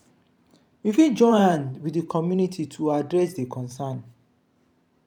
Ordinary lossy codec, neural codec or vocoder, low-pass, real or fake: none; vocoder, 44.1 kHz, 128 mel bands every 512 samples, BigVGAN v2; 19.8 kHz; fake